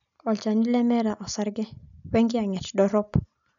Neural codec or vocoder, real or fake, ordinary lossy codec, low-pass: none; real; none; 7.2 kHz